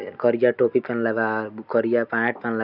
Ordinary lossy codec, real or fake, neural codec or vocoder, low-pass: none; real; none; 5.4 kHz